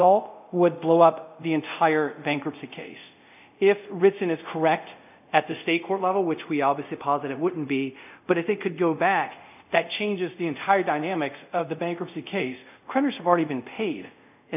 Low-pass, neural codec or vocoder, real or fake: 3.6 kHz; codec, 24 kHz, 0.5 kbps, DualCodec; fake